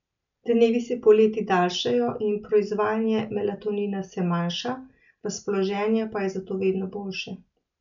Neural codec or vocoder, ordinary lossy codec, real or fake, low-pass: none; none; real; 7.2 kHz